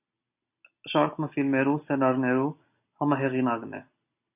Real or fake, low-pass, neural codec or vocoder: real; 3.6 kHz; none